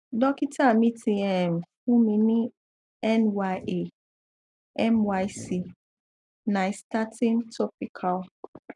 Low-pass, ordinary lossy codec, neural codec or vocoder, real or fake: 10.8 kHz; none; none; real